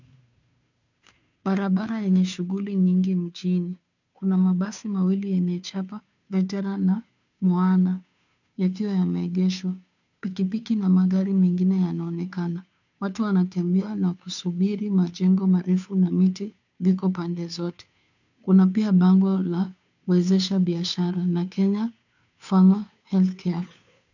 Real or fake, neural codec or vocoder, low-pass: fake; codec, 16 kHz, 2 kbps, FunCodec, trained on Chinese and English, 25 frames a second; 7.2 kHz